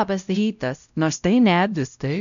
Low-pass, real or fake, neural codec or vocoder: 7.2 kHz; fake; codec, 16 kHz, 0.5 kbps, X-Codec, WavLM features, trained on Multilingual LibriSpeech